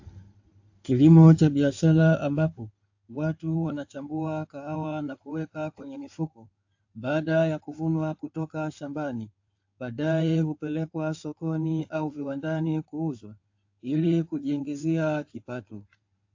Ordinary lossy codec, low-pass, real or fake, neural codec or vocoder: AAC, 48 kbps; 7.2 kHz; fake; codec, 16 kHz in and 24 kHz out, 2.2 kbps, FireRedTTS-2 codec